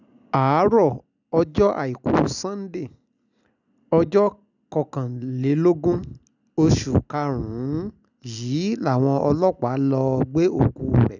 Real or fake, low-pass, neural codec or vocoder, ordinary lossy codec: real; 7.2 kHz; none; none